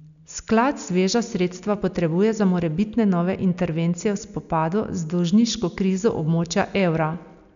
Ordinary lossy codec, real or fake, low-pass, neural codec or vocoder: none; real; 7.2 kHz; none